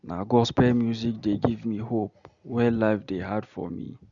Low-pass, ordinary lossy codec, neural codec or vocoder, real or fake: 7.2 kHz; Opus, 64 kbps; none; real